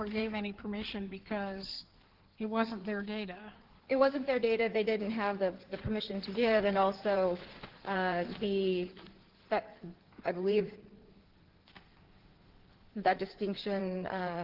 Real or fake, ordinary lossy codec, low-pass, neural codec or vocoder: fake; Opus, 16 kbps; 5.4 kHz; codec, 16 kHz in and 24 kHz out, 2.2 kbps, FireRedTTS-2 codec